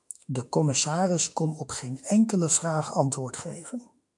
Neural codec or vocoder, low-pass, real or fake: autoencoder, 48 kHz, 32 numbers a frame, DAC-VAE, trained on Japanese speech; 10.8 kHz; fake